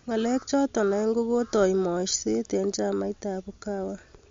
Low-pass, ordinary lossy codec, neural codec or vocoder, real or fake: 7.2 kHz; MP3, 48 kbps; none; real